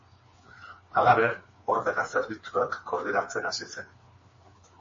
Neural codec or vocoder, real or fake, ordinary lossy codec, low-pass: codec, 16 kHz, 4 kbps, FreqCodec, smaller model; fake; MP3, 32 kbps; 7.2 kHz